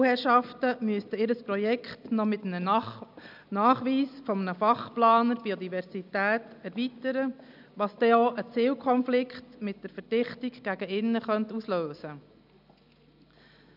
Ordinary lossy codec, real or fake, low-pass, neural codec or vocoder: none; real; 5.4 kHz; none